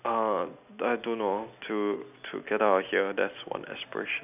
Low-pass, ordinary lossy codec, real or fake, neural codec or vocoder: 3.6 kHz; none; real; none